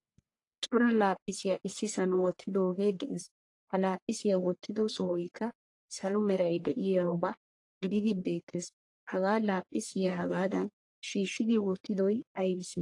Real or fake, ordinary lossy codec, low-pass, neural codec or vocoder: fake; AAC, 48 kbps; 10.8 kHz; codec, 44.1 kHz, 1.7 kbps, Pupu-Codec